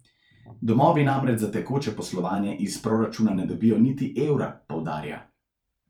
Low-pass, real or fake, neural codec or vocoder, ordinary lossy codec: 19.8 kHz; fake; vocoder, 44.1 kHz, 128 mel bands every 512 samples, BigVGAN v2; none